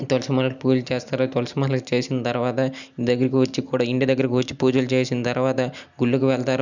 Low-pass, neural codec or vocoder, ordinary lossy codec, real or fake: 7.2 kHz; none; none; real